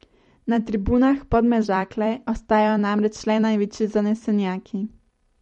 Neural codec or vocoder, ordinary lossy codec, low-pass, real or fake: vocoder, 44.1 kHz, 128 mel bands every 256 samples, BigVGAN v2; MP3, 48 kbps; 19.8 kHz; fake